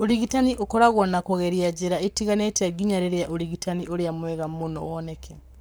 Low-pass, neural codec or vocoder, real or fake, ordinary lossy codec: none; codec, 44.1 kHz, 7.8 kbps, Pupu-Codec; fake; none